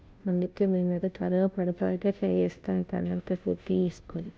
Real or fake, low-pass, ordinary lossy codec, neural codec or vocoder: fake; none; none; codec, 16 kHz, 0.5 kbps, FunCodec, trained on Chinese and English, 25 frames a second